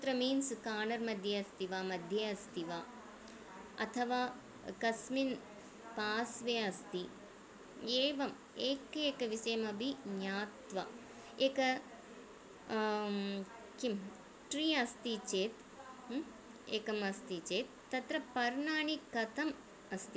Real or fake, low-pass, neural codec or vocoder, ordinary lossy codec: real; none; none; none